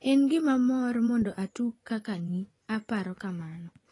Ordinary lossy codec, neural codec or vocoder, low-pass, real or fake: AAC, 32 kbps; vocoder, 24 kHz, 100 mel bands, Vocos; 10.8 kHz; fake